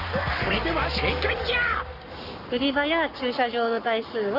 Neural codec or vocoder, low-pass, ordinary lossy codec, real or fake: codec, 44.1 kHz, 7.8 kbps, Pupu-Codec; 5.4 kHz; none; fake